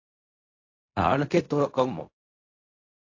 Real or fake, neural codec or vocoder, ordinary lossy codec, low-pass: fake; codec, 16 kHz in and 24 kHz out, 0.4 kbps, LongCat-Audio-Codec, fine tuned four codebook decoder; AAC, 48 kbps; 7.2 kHz